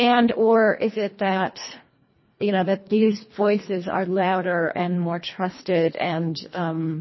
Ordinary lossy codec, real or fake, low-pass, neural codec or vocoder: MP3, 24 kbps; fake; 7.2 kHz; codec, 24 kHz, 1.5 kbps, HILCodec